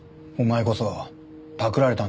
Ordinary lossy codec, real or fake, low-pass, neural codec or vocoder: none; real; none; none